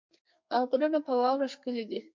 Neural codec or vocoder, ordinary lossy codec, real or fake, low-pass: codec, 32 kHz, 1.9 kbps, SNAC; MP3, 48 kbps; fake; 7.2 kHz